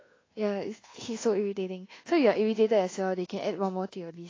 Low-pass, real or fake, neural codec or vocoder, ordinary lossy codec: 7.2 kHz; fake; codec, 24 kHz, 1.2 kbps, DualCodec; AAC, 32 kbps